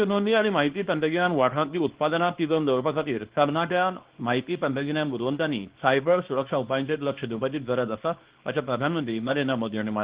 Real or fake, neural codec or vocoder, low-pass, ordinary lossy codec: fake; codec, 24 kHz, 0.9 kbps, WavTokenizer, small release; 3.6 kHz; Opus, 16 kbps